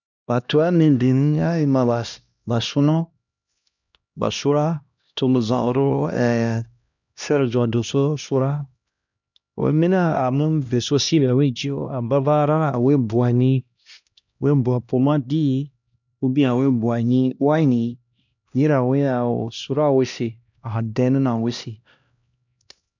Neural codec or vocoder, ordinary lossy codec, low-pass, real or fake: codec, 16 kHz, 1 kbps, X-Codec, HuBERT features, trained on LibriSpeech; none; 7.2 kHz; fake